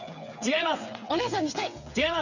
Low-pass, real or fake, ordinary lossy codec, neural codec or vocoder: 7.2 kHz; fake; none; codec, 16 kHz, 8 kbps, FreqCodec, smaller model